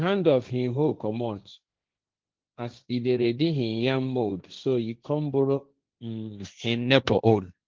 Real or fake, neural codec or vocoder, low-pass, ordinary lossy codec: fake; codec, 16 kHz, 1.1 kbps, Voila-Tokenizer; 7.2 kHz; Opus, 32 kbps